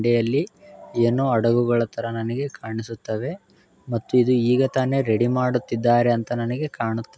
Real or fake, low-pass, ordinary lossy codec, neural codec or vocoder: real; none; none; none